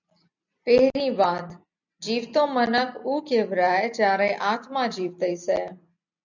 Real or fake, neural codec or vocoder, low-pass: real; none; 7.2 kHz